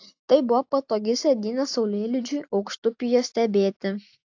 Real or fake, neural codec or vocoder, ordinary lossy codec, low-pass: real; none; AAC, 48 kbps; 7.2 kHz